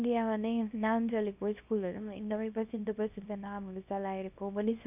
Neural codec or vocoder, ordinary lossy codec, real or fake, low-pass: codec, 24 kHz, 0.9 kbps, WavTokenizer, small release; none; fake; 3.6 kHz